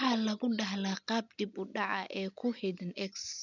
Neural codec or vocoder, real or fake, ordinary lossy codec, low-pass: none; real; none; 7.2 kHz